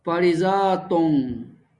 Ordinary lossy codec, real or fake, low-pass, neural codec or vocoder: Opus, 64 kbps; real; 10.8 kHz; none